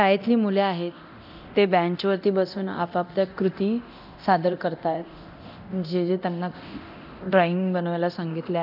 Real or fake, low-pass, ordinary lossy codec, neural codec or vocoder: fake; 5.4 kHz; none; codec, 24 kHz, 0.9 kbps, DualCodec